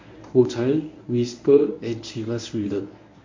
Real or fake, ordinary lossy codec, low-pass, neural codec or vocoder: fake; MP3, 48 kbps; 7.2 kHz; codec, 24 kHz, 0.9 kbps, WavTokenizer, medium speech release version 1